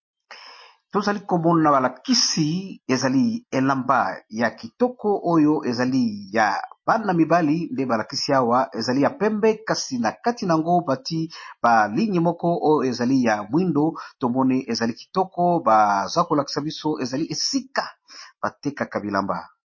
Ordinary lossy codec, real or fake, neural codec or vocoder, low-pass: MP3, 32 kbps; real; none; 7.2 kHz